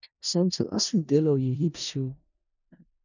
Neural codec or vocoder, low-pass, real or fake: codec, 16 kHz in and 24 kHz out, 0.4 kbps, LongCat-Audio-Codec, four codebook decoder; 7.2 kHz; fake